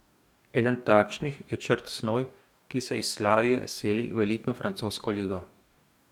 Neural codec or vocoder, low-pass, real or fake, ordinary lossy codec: codec, 44.1 kHz, 2.6 kbps, DAC; 19.8 kHz; fake; none